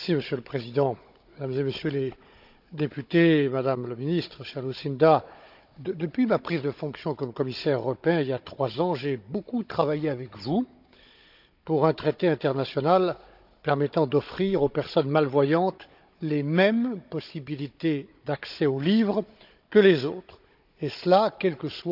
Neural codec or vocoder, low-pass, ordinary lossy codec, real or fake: codec, 16 kHz, 16 kbps, FunCodec, trained on Chinese and English, 50 frames a second; 5.4 kHz; none; fake